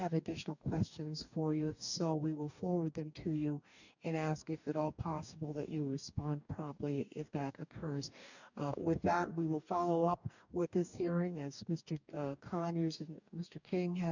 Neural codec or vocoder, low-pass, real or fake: codec, 44.1 kHz, 2.6 kbps, DAC; 7.2 kHz; fake